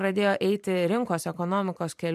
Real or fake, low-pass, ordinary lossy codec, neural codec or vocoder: fake; 14.4 kHz; MP3, 96 kbps; vocoder, 44.1 kHz, 128 mel bands every 512 samples, BigVGAN v2